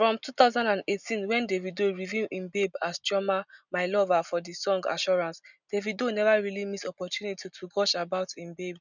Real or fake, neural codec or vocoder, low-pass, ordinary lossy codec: real; none; 7.2 kHz; none